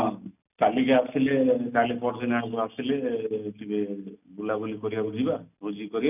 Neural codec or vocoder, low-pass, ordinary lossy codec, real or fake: none; 3.6 kHz; none; real